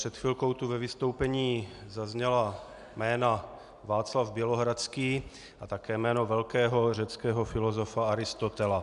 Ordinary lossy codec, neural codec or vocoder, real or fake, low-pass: MP3, 96 kbps; none; real; 10.8 kHz